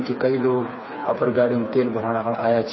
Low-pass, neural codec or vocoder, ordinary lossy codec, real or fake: 7.2 kHz; codec, 16 kHz, 4 kbps, FreqCodec, smaller model; MP3, 24 kbps; fake